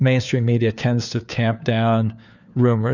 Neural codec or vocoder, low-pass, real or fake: codec, 16 kHz, 4 kbps, FunCodec, trained on LibriTTS, 50 frames a second; 7.2 kHz; fake